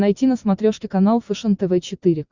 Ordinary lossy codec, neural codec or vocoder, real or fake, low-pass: Opus, 64 kbps; none; real; 7.2 kHz